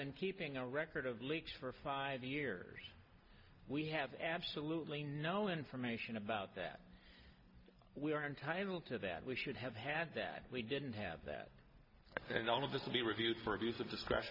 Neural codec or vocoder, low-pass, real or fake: none; 5.4 kHz; real